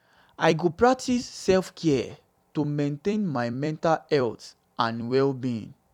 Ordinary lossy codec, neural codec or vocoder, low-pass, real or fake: none; vocoder, 44.1 kHz, 128 mel bands every 256 samples, BigVGAN v2; 19.8 kHz; fake